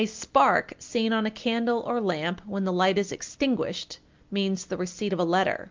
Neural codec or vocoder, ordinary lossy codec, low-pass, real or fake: none; Opus, 32 kbps; 7.2 kHz; real